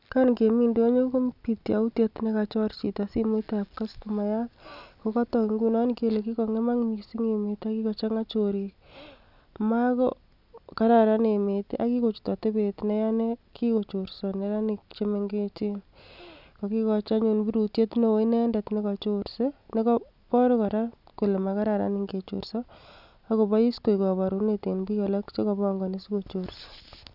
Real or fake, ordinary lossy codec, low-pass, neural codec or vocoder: real; none; 5.4 kHz; none